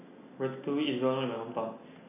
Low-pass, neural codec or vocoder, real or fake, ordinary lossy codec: 3.6 kHz; none; real; none